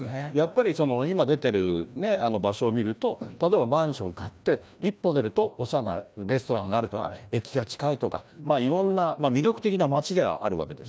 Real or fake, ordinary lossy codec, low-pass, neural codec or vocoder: fake; none; none; codec, 16 kHz, 1 kbps, FreqCodec, larger model